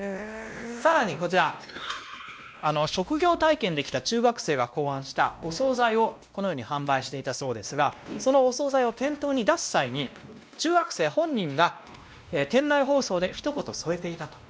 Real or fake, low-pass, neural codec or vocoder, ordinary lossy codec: fake; none; codec, 16 kHz, 1 kbps, X-Codec, WavLM features, trained on Multilingual LibriSpeech; none